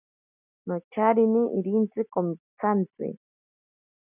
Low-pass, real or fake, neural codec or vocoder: 3.6 kHz; real; none